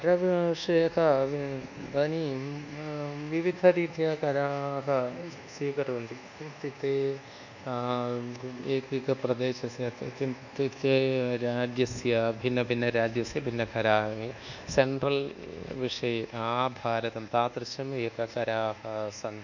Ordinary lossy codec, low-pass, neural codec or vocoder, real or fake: none; 7.2 kHz; codec, 24 kHz, 1.2 kbps, DualCodec; fake